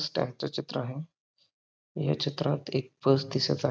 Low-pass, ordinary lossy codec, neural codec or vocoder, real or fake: none; none; none; real